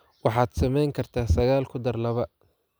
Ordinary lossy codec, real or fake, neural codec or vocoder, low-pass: none; real; none; none